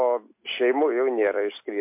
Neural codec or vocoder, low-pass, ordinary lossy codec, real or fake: none; 3.6 kHz; MP3, 24 kbps; real